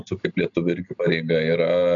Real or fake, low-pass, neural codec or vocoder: real; 7.2 kHz; none